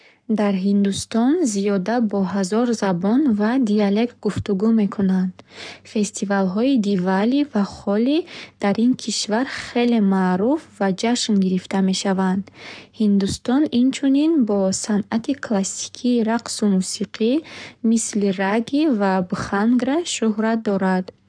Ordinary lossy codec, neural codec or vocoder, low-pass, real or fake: none; codec, 44.1 kHz, 7.8 kbps, Pupu-Codec; 9.9 kHz; fake